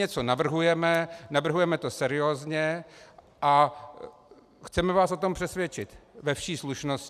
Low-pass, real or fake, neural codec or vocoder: 14.4 kHz; real; none